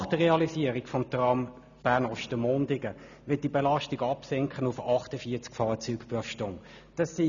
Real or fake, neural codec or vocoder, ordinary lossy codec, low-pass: real; none; none; 7.2 kHz